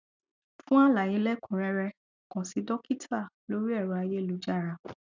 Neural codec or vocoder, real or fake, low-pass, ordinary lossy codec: none; real; 7.2 kHz; none